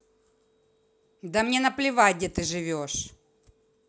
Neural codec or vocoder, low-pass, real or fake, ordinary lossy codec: none; none; real; none